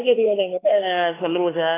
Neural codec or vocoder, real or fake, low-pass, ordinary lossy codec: codec, 16 kHz, 4 kbps, X-Codec, HuBERT features, trained on LibriSpeech; fake; 3.6 kHz; AAC, 16 kbps